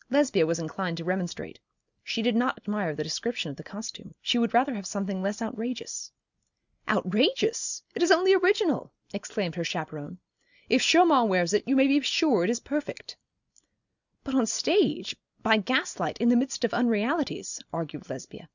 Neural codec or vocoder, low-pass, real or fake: none; 7.2 kHz; real